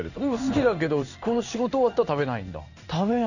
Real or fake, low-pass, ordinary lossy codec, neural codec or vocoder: fake; 7.2 kHz; none; codec, 16 kHz in and 24 kHz out, 1 kbps, XY-Tokenizer